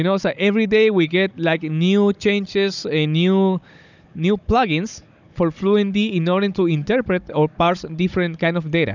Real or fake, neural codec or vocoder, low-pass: fake; codec, 16 kHz, 16 kbps, FunCodec, trained on Chinese and English, 50 frames a second; 7.2 kHz